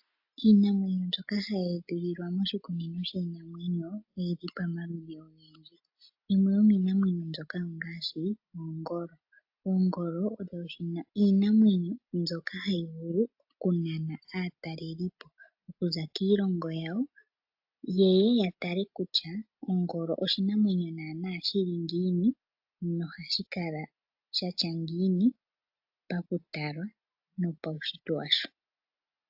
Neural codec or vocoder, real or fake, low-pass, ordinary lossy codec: none; real; 5.4 kHz; AAC, 48 kbps